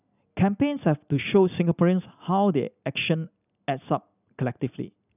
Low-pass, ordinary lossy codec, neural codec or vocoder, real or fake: 3.6 kHz; none; none; real